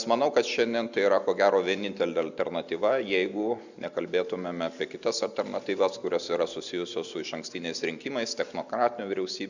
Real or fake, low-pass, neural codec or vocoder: real; 7.2 kHz; none